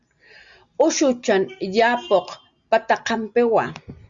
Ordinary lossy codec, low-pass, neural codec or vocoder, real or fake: Opus, 64 kbps; 7.2 kHz; none; real